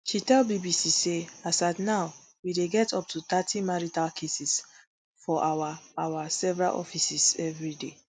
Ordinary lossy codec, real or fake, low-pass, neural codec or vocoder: none; real; none; none